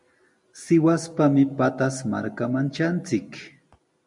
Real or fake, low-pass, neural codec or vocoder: real; 10.8 kHz; none